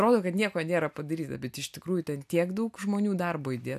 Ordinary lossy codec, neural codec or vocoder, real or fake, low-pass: AAC, 96 kbps; none; real; 14.4 kHz